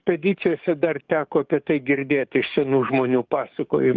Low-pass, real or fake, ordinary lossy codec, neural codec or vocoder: 7.2 kHz; fake; Opus, 32 kbps; codec, 44.1 kHz, 7.8 kbps, DAC